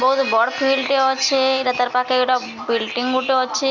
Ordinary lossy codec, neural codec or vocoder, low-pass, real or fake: none; none; 7.2 kHz; real